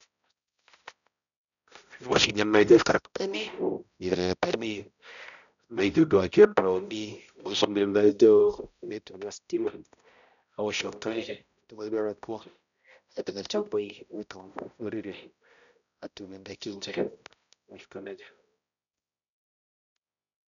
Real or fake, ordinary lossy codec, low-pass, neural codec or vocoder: fake; none; 7.2 kHz; codec, 16 kHz, 0.5 kbps, X-Codec, HuBERT features, trained on balanced general audio